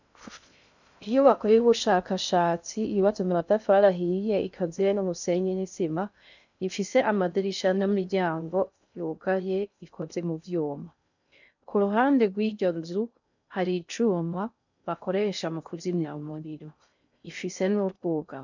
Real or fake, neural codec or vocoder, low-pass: fake; codec, 16 kHz in and 24 kHz out, 0.6 kbps, FocalCodec, streaming, 2048 codes; 7.2 kHz